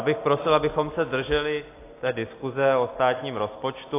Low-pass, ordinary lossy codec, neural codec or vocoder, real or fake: 3.6 kHz; AAC, 24 kbps; none; real